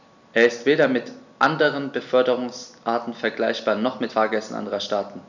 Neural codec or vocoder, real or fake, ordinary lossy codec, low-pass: none; real; none; 7.2 kHz